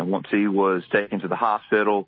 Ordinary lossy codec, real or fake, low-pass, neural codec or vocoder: MP3, 24 kbps; real; 7.2 kHz; none